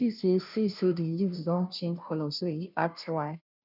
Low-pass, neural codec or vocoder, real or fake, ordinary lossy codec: 5.4 kHz; codec, 16 kHz, 0.5 kbps, FunCodec, trained on Chinese and English, 25 frames a second; fake; none